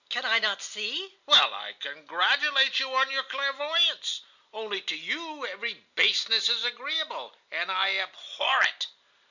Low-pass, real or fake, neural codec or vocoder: 7.2 kHz; real; none